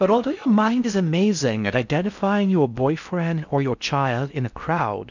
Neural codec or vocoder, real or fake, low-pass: codec, 16 kHz in and 24 kHz out, 0.6 kbps, FocalCodec, streaming, 4096 codes; fake; 7.2 kHz